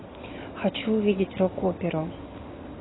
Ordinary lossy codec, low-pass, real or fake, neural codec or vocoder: AAC, 16 kbps; 7.2 kHz; real; none